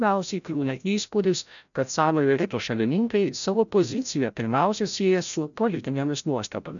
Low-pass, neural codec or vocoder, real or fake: 7.2 kHz; codec, 16 kHz, 0.5 kbps, FreqCodec, larger model; fake